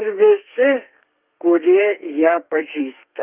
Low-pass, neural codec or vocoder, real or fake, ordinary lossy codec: 3.6 kHz; codec, 32 kHz, 1.9 kbps, SNAC; fake; Opus, 24 kbps